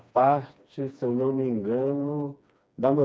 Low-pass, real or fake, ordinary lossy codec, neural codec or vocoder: none; fake; none; codec, 16 kHz, 2 kbps, FreqCodec, smaller model